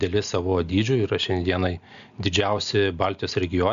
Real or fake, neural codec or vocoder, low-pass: real; none; 7.2 kHz